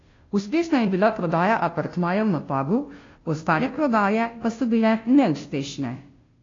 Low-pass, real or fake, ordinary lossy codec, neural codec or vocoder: 7.2 kHz; fake; AAC, 32 kbps; codec, 16 kHz, 0.5 kbps, FunCodec, trained on Chinese and English, 25 frames a second